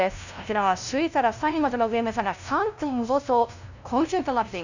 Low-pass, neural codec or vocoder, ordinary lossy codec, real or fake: 7.2 kHz; codec, 16 kHz, 0.5 kbps, FunCodec, trained on LibriTTS, 25 frames a second; none; fake